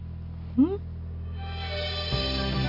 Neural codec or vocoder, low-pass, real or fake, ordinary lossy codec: none; 5.4 kHz; real; none